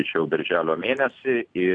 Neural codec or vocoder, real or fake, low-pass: none; real; 9.9 kHz